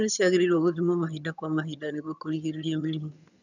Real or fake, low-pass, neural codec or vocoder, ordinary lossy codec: fake; 7.2 kHz; vocoder, 22.05 kHz, 80 mel bands, HiFi-GAN; none